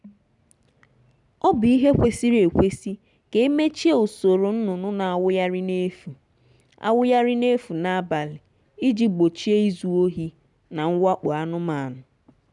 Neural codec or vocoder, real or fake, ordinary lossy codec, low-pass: none; real; none; 10.8 kHz